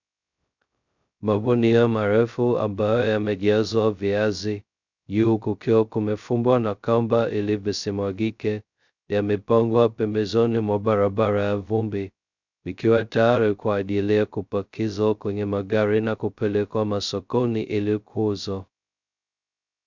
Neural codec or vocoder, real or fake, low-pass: codec, 16 kHz, 0.2 kbps, FocalCodec; fake; 7.2 kHz